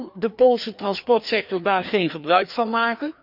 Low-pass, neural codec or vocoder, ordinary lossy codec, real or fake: 5.4 kHz; codec, 16 kHz, 2 kbps, FreqCodec, larger model; none; fake